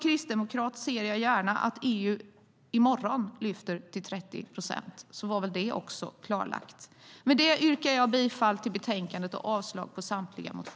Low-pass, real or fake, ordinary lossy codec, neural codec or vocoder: none; real; none; none